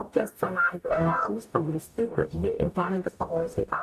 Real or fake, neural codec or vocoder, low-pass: fake; codec, 44.1 kHz, 0.9 kbps, DAC; 14.4 kHz